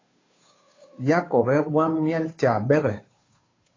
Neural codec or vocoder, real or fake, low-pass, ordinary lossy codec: codec, 16 kHz, 2 kbps, FunCodec, trained on Chinese and English, 25 frames a second; fake; 7.2 kHz; AAC, 32 kbps